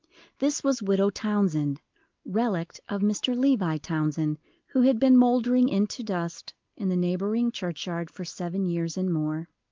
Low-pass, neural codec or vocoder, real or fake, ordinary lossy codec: 7.2 kHz; none; real; Opus, 32 kbps